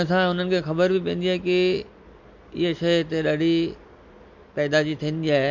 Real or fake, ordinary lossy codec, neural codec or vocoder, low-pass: real; MP3, 48 kbps; none; 7.2 kHz